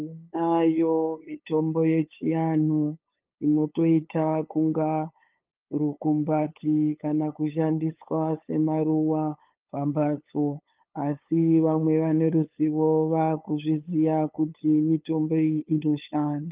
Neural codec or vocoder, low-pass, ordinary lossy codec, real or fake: codec, 16 kHz, 8 kbps, FunCodec, trained on LibriTTS, 25 frames a second; 3.6 kHz; Opus, 32 kbps; fake